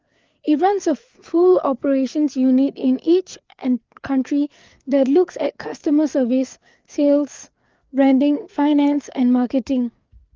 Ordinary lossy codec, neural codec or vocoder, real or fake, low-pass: Opus, 32 kbps; codec, 16 kHz, 4 kbps, FreqCodec, larger model; fake; 7.2 kHz